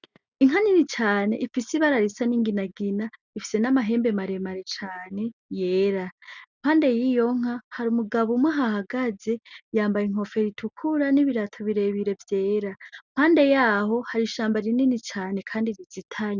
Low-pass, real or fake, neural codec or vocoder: 7.2 kHz; real; none